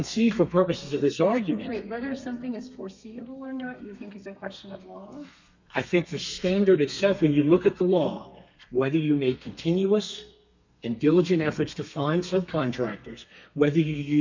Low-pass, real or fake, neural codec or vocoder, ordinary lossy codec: 7.2 kHz; fake; codec, 32 kHz, 1.9 kbps, SNAC; MP3, 64 kbps